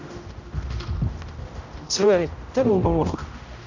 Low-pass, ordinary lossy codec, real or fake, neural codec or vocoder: 7.2 kHz; none; fake; codec, 16 kHz, 0.5 kbps, X-Codec, HuBERT features, trained on general audio